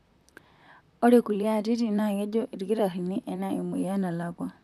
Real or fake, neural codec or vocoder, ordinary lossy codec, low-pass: fake; vocoder, 44.1 kHz, 128 mel bands, Pupu-Vocoder; none; 14.4 kHz